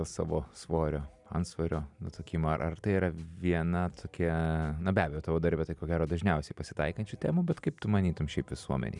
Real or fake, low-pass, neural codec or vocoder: real; 10.8 kHz; none